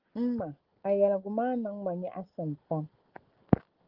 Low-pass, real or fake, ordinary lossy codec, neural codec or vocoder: 5.4 kHz; real; Opus, 16 kbps; none